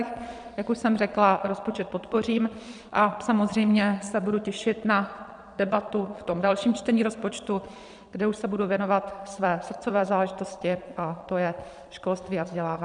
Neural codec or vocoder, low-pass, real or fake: vocoder, 22.05 kHz, 80 mel bands, Vocos; 9.9 kHz; fake